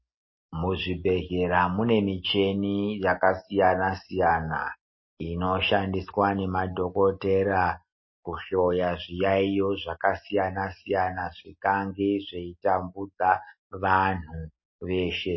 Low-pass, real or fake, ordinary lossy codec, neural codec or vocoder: 7.2 kHz; real; MP3, 24 kbps; none